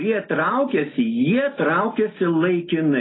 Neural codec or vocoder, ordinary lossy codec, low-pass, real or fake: none; AAC, 16 kbps; 7.2 kHz; real